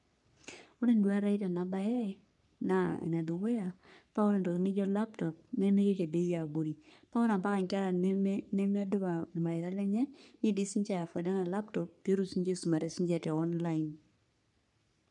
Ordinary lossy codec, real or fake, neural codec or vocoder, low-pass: none; fake; codec, 44.1 kHz, 3.4 kbps, Pupu-Codec; 10.8 kHz